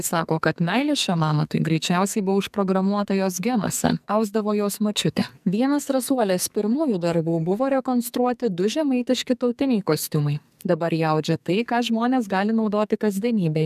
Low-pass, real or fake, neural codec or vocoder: 14.4 kHz; fake; codec, 44.1 kHz, 2.6 kbps, SNAC